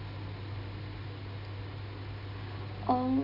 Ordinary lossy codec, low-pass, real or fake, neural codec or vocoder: none; 5.4 kHz; real; none